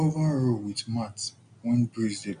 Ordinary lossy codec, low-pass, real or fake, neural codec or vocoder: none; 9.9 kHz; real; none